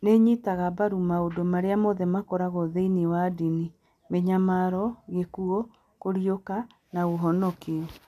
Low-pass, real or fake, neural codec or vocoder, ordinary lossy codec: 14.4 kHz; real; none; none